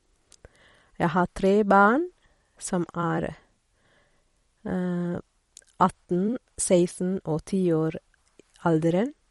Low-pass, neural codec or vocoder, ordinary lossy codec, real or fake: 19.8 kHz; vocoder, 44.1 kHz, 128 mel bands every 256 samples, BigVGAN v2; MP3, 48 kbps; fake